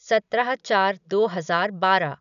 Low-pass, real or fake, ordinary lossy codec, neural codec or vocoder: 7.2 kHz; real; none; none